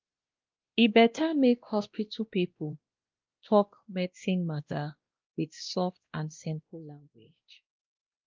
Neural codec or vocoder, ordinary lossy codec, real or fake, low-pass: codec, 16 kHz, 1 kbps, X-Codec, WavLM features, trained on Multilingual LibriSpeech; Opus, 32 kbps; fake; 7.2 kHz